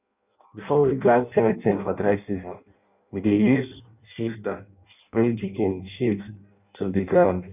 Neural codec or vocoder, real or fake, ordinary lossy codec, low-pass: codec, 16 kHz in and 24 kHz out, 0.6 kbps, FireRedTTS-2 codec; fake; none; 3.6 kHz